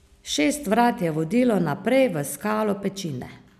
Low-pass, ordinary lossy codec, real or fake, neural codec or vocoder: 14.4 kHz; none; real; none